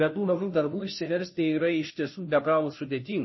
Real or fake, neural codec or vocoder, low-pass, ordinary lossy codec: fake; codec, 16 kHz, 0.5 kbps, FunCodec, trained on Chinese and English, 25 frames a second; 7.2 kHz; MP3, 24 kbps